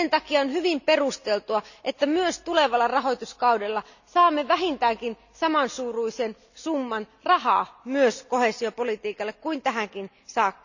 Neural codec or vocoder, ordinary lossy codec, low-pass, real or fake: none; none; 7.2 kHz; real